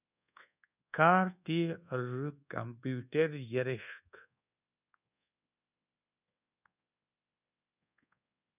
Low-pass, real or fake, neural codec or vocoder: 3.6 kHz; fake; codec, 24 kHz, 1.2 kbps, DualCodec